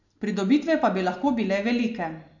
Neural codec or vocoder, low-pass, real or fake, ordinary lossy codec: none; 7.2 kHz; real; none